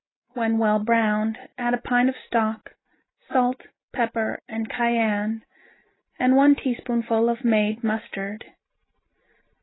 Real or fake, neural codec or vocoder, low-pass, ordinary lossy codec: real; none; 7.2 kHz; AAC, 16 kbps